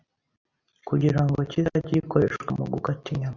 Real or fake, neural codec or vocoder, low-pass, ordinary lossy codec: real; none; 7.2 kHz; Opus, 64 kbps